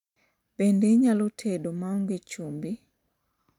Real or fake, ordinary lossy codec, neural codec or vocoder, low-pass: fake; none; vocoder, 44.1 kHz, 128 mel bands every 512 samples, BigVGAN v2; 19.8 kHz